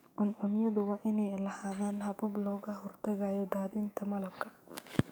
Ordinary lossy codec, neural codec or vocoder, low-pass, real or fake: none; codec, 44.1 kHz, 7.8 kbps, DAC; none; fake